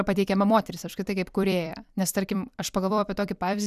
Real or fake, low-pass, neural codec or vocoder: fake; 14.4 kHz; vocoder, 44.1 kHz, 128 mel bands every 256 samples, BigVGAN v2